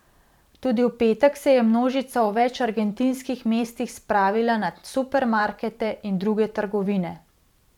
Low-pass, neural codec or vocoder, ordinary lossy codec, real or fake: 19.8 kHz; vocoder, 44.1 kHz, 128 mel bands every 512 samples, BigVGAN v2; none; fake